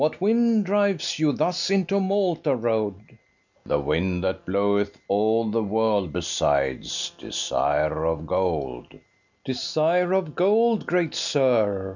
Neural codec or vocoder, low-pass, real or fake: none; 7.2 kHz; real